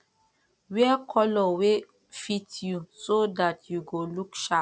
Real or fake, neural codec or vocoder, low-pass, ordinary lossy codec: real; none; none; none